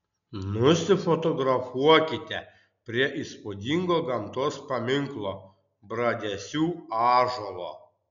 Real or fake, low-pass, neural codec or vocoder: real; 7.2 kHz; none